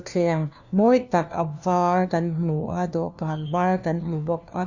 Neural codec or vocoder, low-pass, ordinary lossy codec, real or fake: codec, 16 kHz, 1 kbps, FunCodec, trained on LibriTTS, 50 frames a second; 7.2 kHz; none; fake